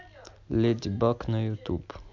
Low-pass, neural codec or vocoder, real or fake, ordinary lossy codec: 7.2 kHz; none; real; MP3, 64 kbps